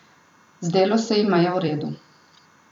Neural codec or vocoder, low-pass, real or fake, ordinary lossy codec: vocoder, 44.1 kHz, 128 mel bands every 256 samples, BigVGAN v2; 19.8 kHz; fake; none